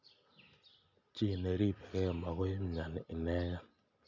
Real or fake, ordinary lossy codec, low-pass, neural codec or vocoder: real; MP3, 64 kbps; 7.2 kHz; none